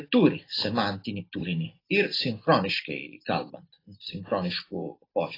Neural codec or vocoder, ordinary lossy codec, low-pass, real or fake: none; AAC, 24 kbps; 5.4 kHz; real